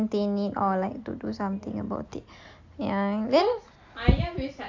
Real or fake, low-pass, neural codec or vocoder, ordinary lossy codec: real; 7.2 kHz; none; AAC, 48 kbps